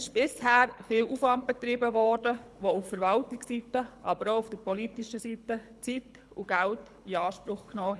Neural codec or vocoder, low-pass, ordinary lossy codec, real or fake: codec, 24 kHz, 6 kbps, HILCodec; none; none; fake